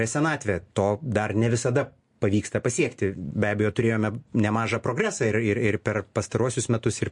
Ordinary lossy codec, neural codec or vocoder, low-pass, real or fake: MP3, 48 kbps; none; 10.8 kHz; real